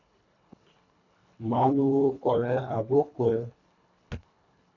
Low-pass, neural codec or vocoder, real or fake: 7.2 kHz; codec, 24 kHz, 1.5 kbps, HILCodec; fake